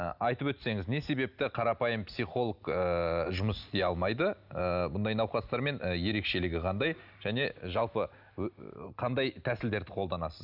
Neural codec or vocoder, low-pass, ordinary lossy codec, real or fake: none; 5.4 kHz; none; real